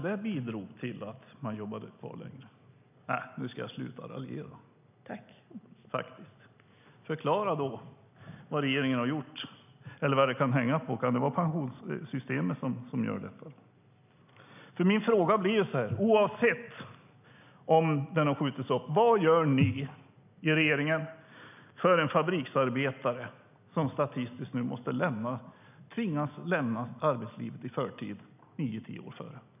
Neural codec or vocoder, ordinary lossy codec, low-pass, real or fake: none; none; 3.6 kHz; real